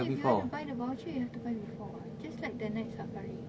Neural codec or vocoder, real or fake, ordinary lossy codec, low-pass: none; real; none; none